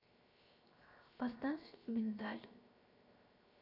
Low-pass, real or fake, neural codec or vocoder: 5.4 kHz; fake; codec, 16 kHz, 0.7 kbps, FocalCodec